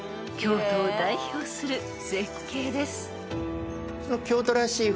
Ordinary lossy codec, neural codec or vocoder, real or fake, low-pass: none; none; real; none